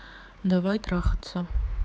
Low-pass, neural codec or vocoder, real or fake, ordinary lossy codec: none; codec, 16 kHz, 4 kbps, X-Codec, HuBERT features, trained on general audio; fake; none